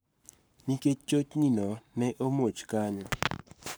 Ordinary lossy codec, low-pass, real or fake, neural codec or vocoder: none; none; fake; codec, 44.1 kHz, 7.8 kbps, Pupu-Codec